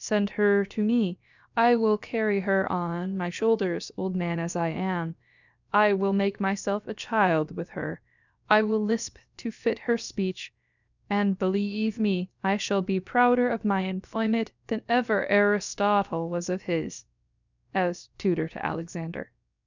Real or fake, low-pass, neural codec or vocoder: fake; 7.2 kHz; codec, 16 kHz, about 1 kbps, DyCAST, with the encoder's durations